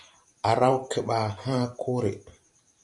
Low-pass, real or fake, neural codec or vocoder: 10.8 kHz; fake; vocoder, 44.1 kHz, 128 mel bands every 512 samples, BigVGAN v2